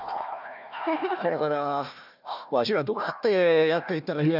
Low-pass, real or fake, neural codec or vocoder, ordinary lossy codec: 5.4 kHz; fake; codec, 16 kHz, 1 kbps, FunCodec, trained on Chinese and English, 50 frames a second; none